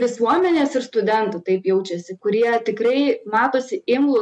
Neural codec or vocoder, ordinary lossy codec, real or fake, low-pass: none; MP3, 96 kbps; real; 10.8 kHz